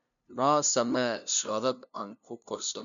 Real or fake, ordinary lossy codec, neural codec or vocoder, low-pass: fake; none; codec, 16 kHz, 0.5 kbps, FunCodec, trained on LibriTTS, 25 frames a second; 7.2 kHz